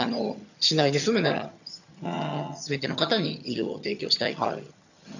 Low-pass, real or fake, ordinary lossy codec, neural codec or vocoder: 7.2 kHz; fake; none; vocoder, 22.05 kHz, 80 mel bands, HiFi-GAN